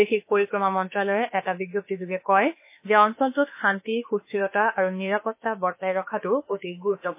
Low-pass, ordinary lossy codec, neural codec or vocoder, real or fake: 3.6 kHz; MP3, 24 kbps; autoencoder, 48 kHz, 32 numbers a frame, DAC-VAE, trained on Japanese speech; fake